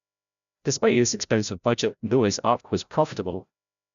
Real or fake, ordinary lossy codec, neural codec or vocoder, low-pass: fake; none; codec, 16 kHz, 0.5 kbps, FreqCodec, larger model; 7.2 kHz